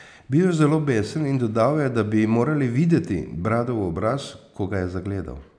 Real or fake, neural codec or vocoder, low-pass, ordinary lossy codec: real; none; 9.9 kHz; none